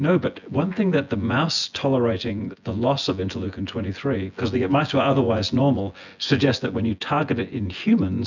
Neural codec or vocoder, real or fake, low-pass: vocoder, 24 kHz, 100 mel bands, Vocos; fake; 7.2 kHz